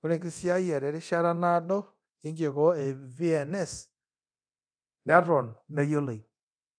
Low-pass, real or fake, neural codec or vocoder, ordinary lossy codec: 9.9 kHz; fake; codec, 24 kHz, 0.9 kbps, DualCodec; none